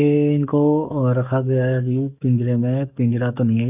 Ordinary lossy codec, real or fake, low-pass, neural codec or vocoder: none; fake; 3.6 kHz; codec, 44.1 kHz, 7.8 kbps, DAC